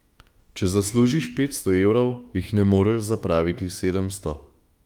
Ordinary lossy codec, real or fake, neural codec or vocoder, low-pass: Opus, 32 kbps; fake; autoencoder, 48 kHz, 32 numbers a frame, DAC-VAE, trained on Japanese speech; 19.8 kHz